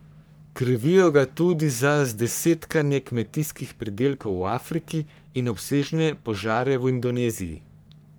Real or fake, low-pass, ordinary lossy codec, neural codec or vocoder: fake; none; none; codec, 44.1 kHz, 3.4 kbps, Pupu-Codec